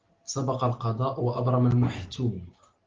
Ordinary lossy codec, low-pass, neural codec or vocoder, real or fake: Opus, 16 kbps; 7.2 kHz; none; real